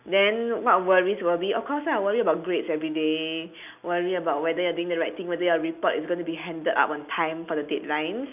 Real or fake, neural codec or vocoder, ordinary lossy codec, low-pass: real; none; none; 3.6 kHz